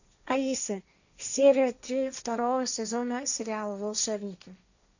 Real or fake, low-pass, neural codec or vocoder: fake; 7.2 kHz; codec, 16 kHz, 1.1 kbps, Voila-Tokenizer